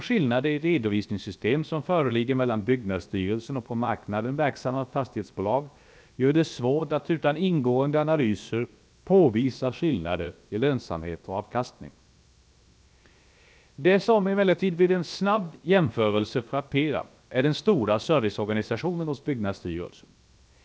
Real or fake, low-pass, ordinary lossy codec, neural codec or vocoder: fake; none; none; codec, 16 kHz, 0.7 kbps, FocalCodec